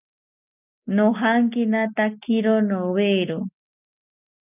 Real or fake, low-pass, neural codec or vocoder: real; 3.6 kHz; none